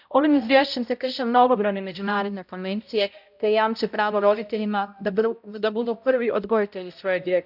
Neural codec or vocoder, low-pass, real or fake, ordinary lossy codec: codec, 16 kHz, 0.5 kbps, X-Codec, HuBERT features, trained on balanced general audio; 5.4 kHz; fake; none